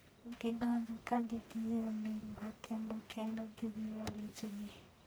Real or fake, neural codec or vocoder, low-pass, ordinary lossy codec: fake; codec, 44.1 kHz, 1.7 kbps, Pupu-Codec; none; none